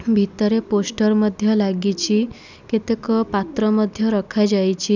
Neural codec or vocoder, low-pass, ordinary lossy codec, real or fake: none; 7.2 kHz; none; real